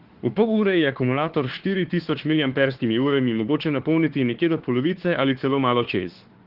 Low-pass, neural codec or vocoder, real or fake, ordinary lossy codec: 5.4 kHz; autoencoder, 48 kHz, 32 numbers a frame, DAC-VAE, trained on Japanese speech; fake; Opus, 16 kbps